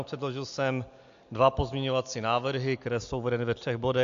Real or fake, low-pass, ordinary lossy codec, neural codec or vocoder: real; 7.2 kHz; AAC, 64 kbps; none